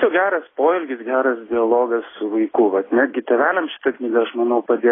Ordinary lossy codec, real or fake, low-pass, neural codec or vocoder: AAC, 16 kbps; real; 7.2 kHz; none